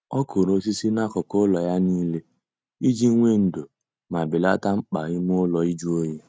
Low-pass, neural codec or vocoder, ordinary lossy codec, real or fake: none; none; none; real